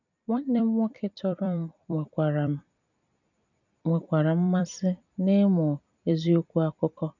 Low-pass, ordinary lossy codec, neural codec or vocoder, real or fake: 7.2 kHz; none; vocoder, 44.1 kHz, 128 mel bands every 256 samples, BigVGAN v2; fake